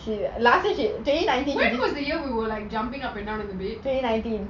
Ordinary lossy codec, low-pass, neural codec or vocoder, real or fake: none; 7.2 kHz; none; real